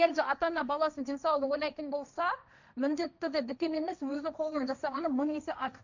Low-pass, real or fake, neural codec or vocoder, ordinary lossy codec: 7.2 kHz; fake; codec, 16 kHz, 1.1 kbps, Voila-Tokenizer; none